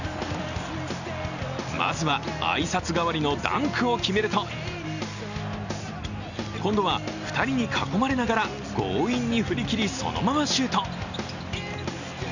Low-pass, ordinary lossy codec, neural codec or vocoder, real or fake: 7.2 kHz; none; none; real